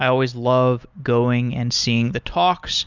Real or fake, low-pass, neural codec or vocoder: real; 7.2 kHz; none